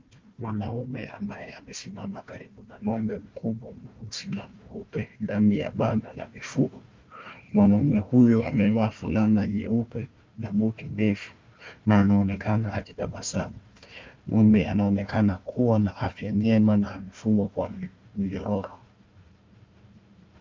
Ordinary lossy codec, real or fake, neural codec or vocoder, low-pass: Opus, 24 kbps; fake; codec, 16 kHz, 1 kbps, FunCodec, trained on Chinese and English, 50 frames a second; 7.2 kHz